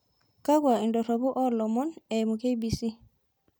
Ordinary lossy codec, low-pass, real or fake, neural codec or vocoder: none; none; real; none